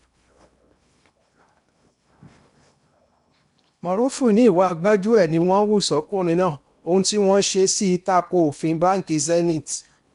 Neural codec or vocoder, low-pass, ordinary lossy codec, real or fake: codec, 16 kHz in and 24 kHz out, 0.8 kbps, FocalCodec, streaming, 65536 codes; 10.8 kHz; none; fake